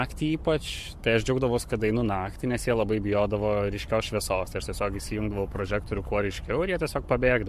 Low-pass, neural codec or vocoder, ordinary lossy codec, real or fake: 14.4 kHz; codec, 44.1 kHz, 7.8 kbps, Pupu-Codec; MP3, 64 kbps; fake